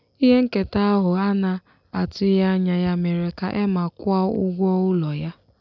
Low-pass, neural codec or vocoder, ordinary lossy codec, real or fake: 7.2 kHz; none; none; real